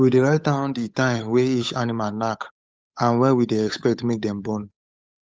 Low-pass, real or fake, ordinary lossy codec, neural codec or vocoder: none; fake; none; codec, 16 kHz, 8 kbps, FunCodec, trained on Chinese and English, 25 frames a second